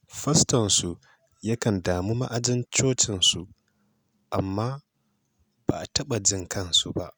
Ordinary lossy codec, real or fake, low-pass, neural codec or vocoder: none; real; none; none